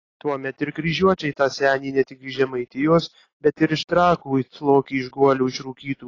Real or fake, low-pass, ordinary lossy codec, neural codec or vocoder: real; 7.2 kHz; AAC, 32 kbps; none